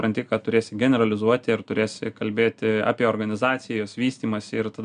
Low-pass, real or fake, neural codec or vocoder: 9.9 kHz; real; none